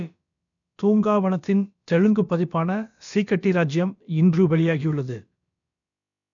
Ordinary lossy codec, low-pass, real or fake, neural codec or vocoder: none; 7.2 kHz; fake; codec, 16 kHz, about 1 kbps, DyCAST, with the encoder's durations